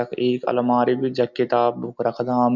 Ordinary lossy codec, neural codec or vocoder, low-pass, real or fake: none; none; 7.2 kHz; real